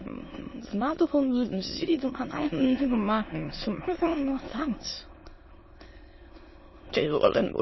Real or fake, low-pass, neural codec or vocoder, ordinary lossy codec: fake; 7.2 kHz; autoencoder, 22.05 kHz, a latent of 192 numbers a frame, VITS, trained on many speakers; MP3, 24 kbps